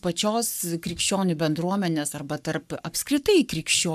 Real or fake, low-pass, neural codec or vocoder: fake; 14.4 kHz; codec, 44.1 kHz, 7.8 kbps, Pupu-Codec